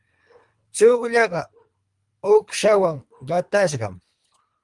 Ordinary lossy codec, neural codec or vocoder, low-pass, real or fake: Opus, 32 kbps; codec, 24 kHz, 3 kbps, HILCodec; 10.8 kHz; fake